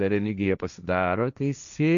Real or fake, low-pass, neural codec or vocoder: fake; 7.2 kHz; codec, 16 kHz, 1.1 kbps, Voila-Tokenizer